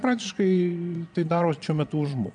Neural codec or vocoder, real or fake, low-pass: vocoder, 22.05 kHz, 80 mel bands, Vocos; fake; 9.9 kHz